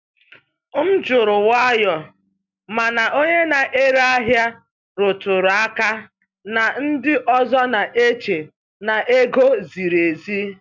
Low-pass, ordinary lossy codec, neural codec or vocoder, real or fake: 7.2 kHz; MP3, 64 kbps; none; real